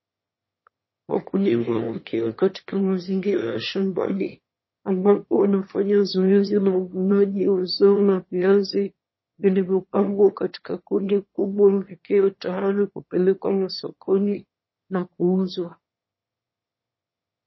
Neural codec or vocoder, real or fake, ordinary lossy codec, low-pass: autoencoder, 22.05 kHz, a latent of 192 numbers a frame, VITS, trained on one speaker; fake; MP3, 24 kbps; 7.2 kHz